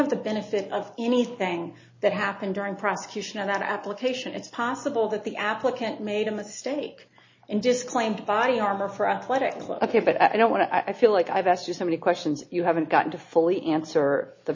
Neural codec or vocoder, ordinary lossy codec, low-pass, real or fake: none; MP3, 32 kbps; 7.2 kHz; real